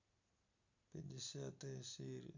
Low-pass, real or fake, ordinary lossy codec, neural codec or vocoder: 7.2 kHz; real; MP3, 64 kbps; none